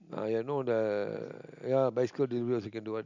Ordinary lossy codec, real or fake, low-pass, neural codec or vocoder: none; real; 7.2 kHz; none